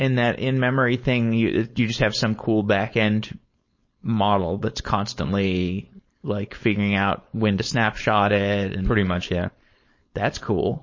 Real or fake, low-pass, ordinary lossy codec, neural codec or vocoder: fake; 7.2 kHz; MP3, 32 kbps; codec, 16 kHz, 4.8 kbps, FACodec